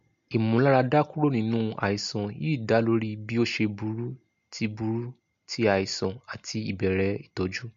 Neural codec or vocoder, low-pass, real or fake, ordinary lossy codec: none; 7.2 kHz; real; MP3, 48 kbps